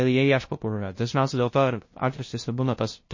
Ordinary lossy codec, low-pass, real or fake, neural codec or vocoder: MP3, 32 kbps; 7.2 kHz; fake; codec, 16 kHz, 0.5 kbps, FunCodec, trained on LibriTTS, 25 frames a second